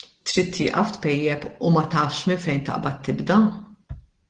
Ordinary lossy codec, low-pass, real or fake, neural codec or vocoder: Opus, 16 kbps; 9.9 kHz; real; none